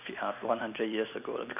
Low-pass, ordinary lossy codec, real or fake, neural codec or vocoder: 3.6 kHz; none; real; none